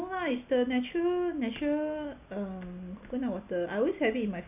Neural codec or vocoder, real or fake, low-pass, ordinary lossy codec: none; real; 3.6 kHz; none